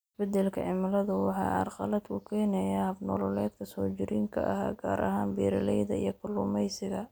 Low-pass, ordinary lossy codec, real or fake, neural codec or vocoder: none; none; real; none